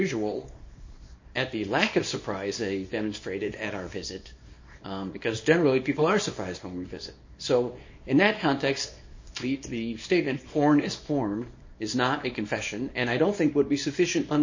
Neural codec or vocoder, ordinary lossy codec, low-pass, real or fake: codec, 24 kHz, 0.9 kbps, WavTokenizer, small release; MP3, 32 kbps; 7.2 kHz; fake